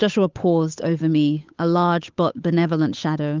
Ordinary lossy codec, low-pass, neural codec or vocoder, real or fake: Opus, 32 kbps; 7.2 kHz; codec, 16 kHz, 8 kbps, FunCodec, trained on Chinese and English, 25 frames a second; fake